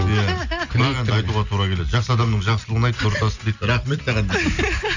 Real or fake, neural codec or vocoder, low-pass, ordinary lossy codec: real; none; 7.2 kHz; none